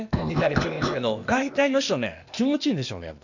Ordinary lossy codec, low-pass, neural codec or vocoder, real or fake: none; 7.2 kHz; codec, 16 kHz, 0.8 kbps, ZipCodec; fake